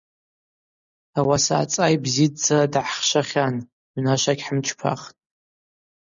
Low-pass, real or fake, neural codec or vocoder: 7.2 kHz; real; none